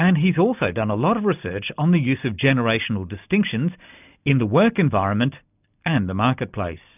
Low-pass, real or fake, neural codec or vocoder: 3.6 kHz; real; none